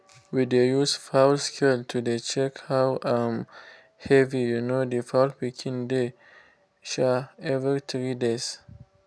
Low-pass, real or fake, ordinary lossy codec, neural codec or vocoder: none; real; none; none